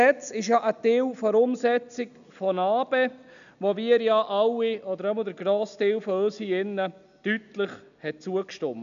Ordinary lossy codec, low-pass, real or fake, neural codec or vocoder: none; 7.2 kHz; real; none